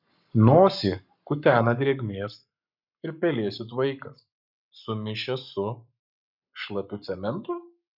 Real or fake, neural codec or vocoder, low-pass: fake; codec, 44.1 kHz, 7.8 kbps, Pupu-Codec; 5.4 kHz